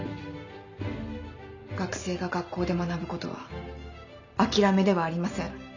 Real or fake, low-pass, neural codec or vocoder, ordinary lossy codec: real; 7.2 kHz; none; none